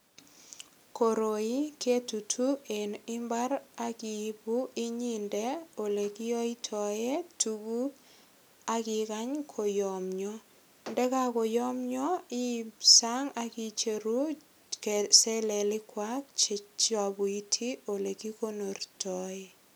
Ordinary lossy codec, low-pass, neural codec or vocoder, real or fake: none; none; none; real